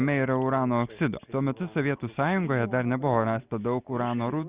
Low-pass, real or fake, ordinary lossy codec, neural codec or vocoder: 3.6 kHz; real; Opus, 64 kbps; none